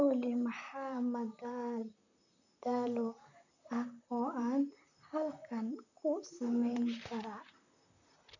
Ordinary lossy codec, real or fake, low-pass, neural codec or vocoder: none; fake; 7.2 kHz; vocoder, 44.1 kHz, 128 mel bands, Pupu-Vocoder